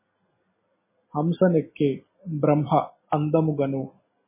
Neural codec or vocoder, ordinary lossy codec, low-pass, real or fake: none; MP3, 16 kbps; 3.6 kHz; real